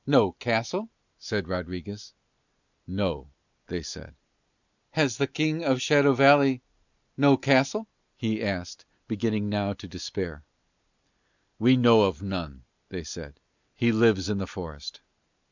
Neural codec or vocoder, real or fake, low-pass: none; real; 7.2 kHz